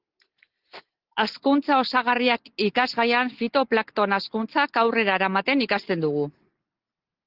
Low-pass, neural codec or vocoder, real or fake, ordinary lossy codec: 5.4 kHz; none; real; Opus, 16 kbps